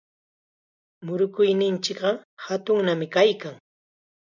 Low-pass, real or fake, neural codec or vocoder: 7.2 kHz; real; none